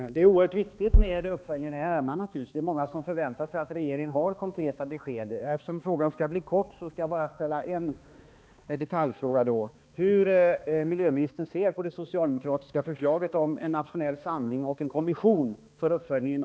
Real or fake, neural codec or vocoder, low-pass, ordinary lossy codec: fake; codec, 16 kHz, 2 kbps, X-Codec, HuBERT features, trained on balanced general audio; none; none